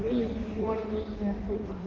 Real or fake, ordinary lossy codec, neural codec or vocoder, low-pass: fake; Opus, 16 kbps; codec, 16 kHz in and 24 kHz out, 1.1 kbps, FireRedTTS-2 codec; 7.2 kHz